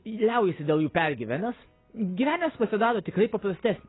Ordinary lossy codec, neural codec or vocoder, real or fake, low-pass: AAC, 16 kbps; none; real; 7.2 kHz